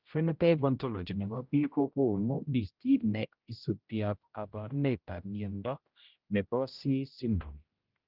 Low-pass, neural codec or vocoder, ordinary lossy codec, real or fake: 5.4 kHz; codec, 16 kHz, 0.5 kbps, X-Codec, HuBERT features, trained on general audio; Opus, 32 kbps; fake